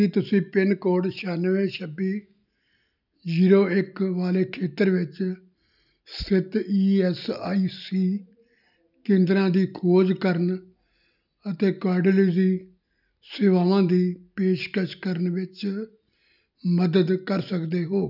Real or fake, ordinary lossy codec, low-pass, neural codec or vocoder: real; none; 5.4 kHz; none